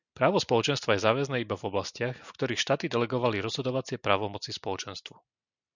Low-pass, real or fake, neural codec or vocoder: 7.2 kHz; real; none